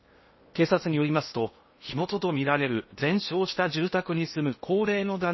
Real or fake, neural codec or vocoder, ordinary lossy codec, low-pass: fake; codec, 16 kHz in and 24 kHz out, 0.8 kbps, FocalCodec, streaming, 65536 codes; MP3, 24 kbps; 7.2 kHz